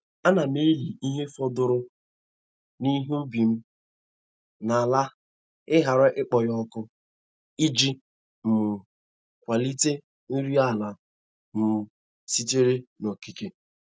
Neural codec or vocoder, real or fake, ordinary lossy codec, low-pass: none; real; none; none